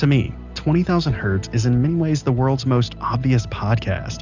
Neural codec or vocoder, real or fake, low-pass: none; real; 7.2 kHz